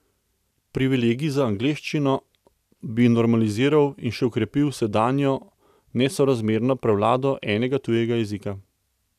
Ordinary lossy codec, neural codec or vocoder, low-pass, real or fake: none; none; 14.4 kHz; real